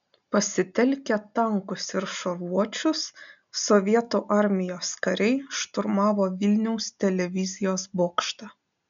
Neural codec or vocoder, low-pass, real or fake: none; 7.2 kHz; real